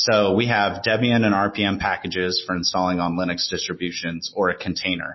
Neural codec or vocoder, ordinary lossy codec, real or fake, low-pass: none; MP3, 24 kbps; real; 7.2 kHz